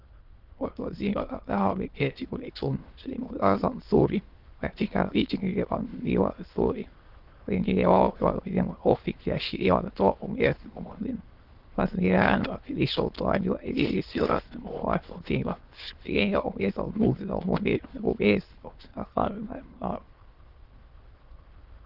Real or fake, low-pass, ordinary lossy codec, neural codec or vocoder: fake; 5.4 kHz; Opus, 16 kbps; autoencoder, 22.05 kHz, a latent of 192 numbers a frame, VITS, trained on many speakers